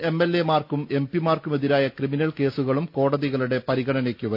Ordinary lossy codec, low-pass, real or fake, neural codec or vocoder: none; 5.4 kHz; real; none